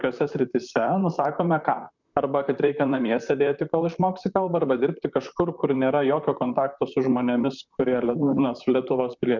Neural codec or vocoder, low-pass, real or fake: vocoder, 44.1 kHz, 128 mel bands, Pupu-Vocoder; 7.2 kHz; fake